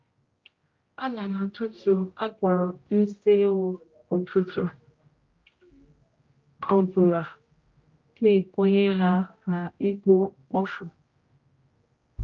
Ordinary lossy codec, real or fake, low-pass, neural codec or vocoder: Opus, 32 kbps; fake; 7.2 kHz; codec, 16 kHz, 0.5 kbps, X-Codec, HuBERT features, trained on general audio